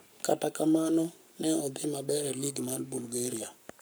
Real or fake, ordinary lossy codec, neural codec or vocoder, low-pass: fake; none; codec, 44.1 kHz, 7.8 kbps, Pupu-Codec; none